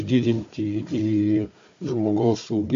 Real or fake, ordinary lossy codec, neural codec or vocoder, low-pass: fake; MP3, 48 kbps; codec, 16 kHz, 4 kbps, FunCodec, trained on Chinese and English, 50 frames a second; 7.2 kHz